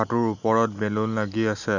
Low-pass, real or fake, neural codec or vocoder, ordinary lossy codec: 7.2 kHz; real; none; none